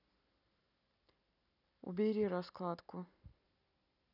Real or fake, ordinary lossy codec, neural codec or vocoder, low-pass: real; none; none; 5.4 kHz